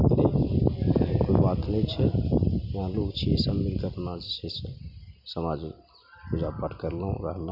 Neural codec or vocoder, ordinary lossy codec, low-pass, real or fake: none; none; 5.4 kHz; real